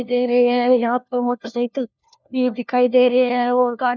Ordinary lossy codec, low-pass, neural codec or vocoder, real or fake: none; none; codec, 16 kHz, 1 kbps, FunCodec, trained on LibriTTS, 50 frames a second; fake